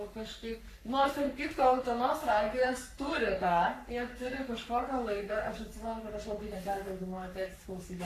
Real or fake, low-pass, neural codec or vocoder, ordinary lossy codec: fake; 14.4 kHz; codec, 44.1 kHz, 3.4 kbps, Pupu-Codec; AAC, 64 kbps